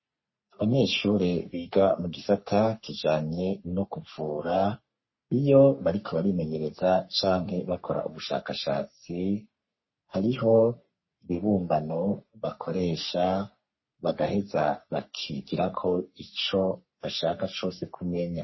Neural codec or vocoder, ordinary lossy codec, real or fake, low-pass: codec, 44.1 kHz, 3.4 kbps, Pupu-Codec; MP3, 24 kbps; fake; 7.2 kHz